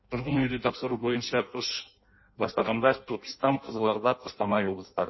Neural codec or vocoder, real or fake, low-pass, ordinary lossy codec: codec, 16 kHz in and 24 kHz out, 0.6 kbps, FireRedTTS-2 codec; fake; 7.2 kHz; MP3, 24 kbps